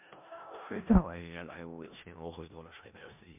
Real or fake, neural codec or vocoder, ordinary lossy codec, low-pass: fake; codec, 16 kHz in and 24 kHz out, 0.4 kbps, LongCat-Audio-Codec, four codebook decoder; MP3, 32 kbps; 3.6 kHz